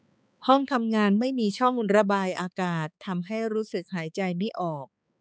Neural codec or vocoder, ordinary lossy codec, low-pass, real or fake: codec, 16 kHz, 4 kbps, X-Codec, HuBERT features, trained on balanced general audio; none; none; fake